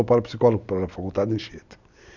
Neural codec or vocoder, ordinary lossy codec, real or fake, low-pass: none; none; real; 7.2 kHz